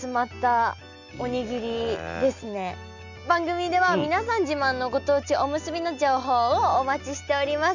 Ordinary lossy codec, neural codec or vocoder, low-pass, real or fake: none; none; 7.2 kHz; real